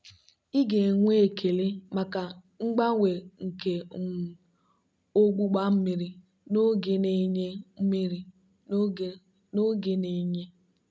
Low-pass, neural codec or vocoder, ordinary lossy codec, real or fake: none; none; none; real